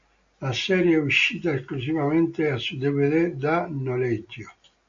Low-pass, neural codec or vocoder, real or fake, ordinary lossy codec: 7.2 kHz; none; real; MP3, 48 kbps